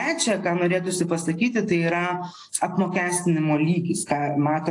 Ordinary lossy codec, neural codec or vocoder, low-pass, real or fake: AAC, 48 kbps; none; 10.8 kHz; real